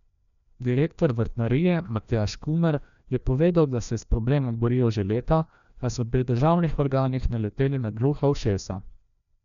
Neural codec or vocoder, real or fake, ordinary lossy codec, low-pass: codec, 16 kHz, 1 kbps, FreqCodec, larger model; fake; none; 7.2 kHz